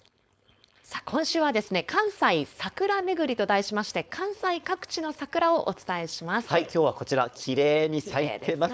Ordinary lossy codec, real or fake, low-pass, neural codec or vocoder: none; fake; none; codec, 16 kHz, 4.8 kbps, FACodec